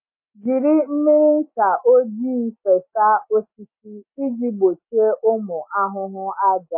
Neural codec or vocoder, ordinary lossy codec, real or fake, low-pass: none; MP3, 24 kbps; real; 3.6 kHz